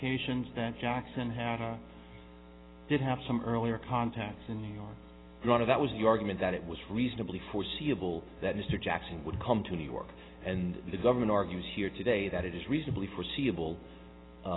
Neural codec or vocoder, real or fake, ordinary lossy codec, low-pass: none; real; AAC, 16 kbps; 7.2 kHz